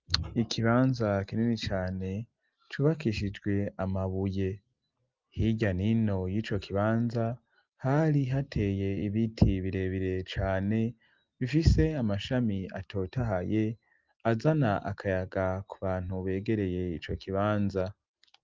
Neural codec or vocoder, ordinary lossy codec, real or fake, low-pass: none; Opus, 32 kbps; real; 7.2 kHz